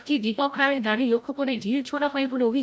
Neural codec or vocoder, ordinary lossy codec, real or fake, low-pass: codec, 16 kHz, 0.5 kbps, FreqCodec, larger model; none; fake; none